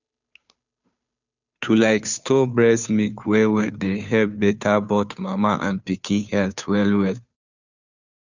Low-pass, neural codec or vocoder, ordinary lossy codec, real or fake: 7.2 kHz; codec, 16 kHz, 2 kbps, FunCodec, trained on Chinese and English, 25 frames a second; none; fake